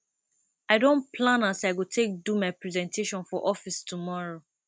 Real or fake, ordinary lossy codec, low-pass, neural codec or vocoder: real; none; none; none